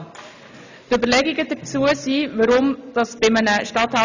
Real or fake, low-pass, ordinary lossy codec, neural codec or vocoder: real; 7.2 kHz; none; none